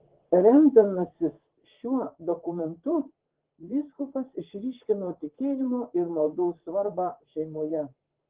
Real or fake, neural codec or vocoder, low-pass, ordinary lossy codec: fake; vocoder, 44.1 kHz, 128 mel bands, Pupu-Vocoder; 3.6 kHz; Opus, 16 kbps